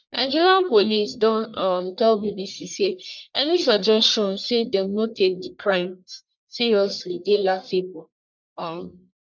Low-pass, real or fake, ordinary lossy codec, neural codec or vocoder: 7.2 kHz; fake; none; codec, 44.1 kHz, 1.7 kbps, Pupu-Codec